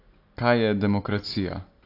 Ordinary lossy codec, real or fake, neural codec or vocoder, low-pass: AAC, 32 kbps; real; none; 5.4 kHz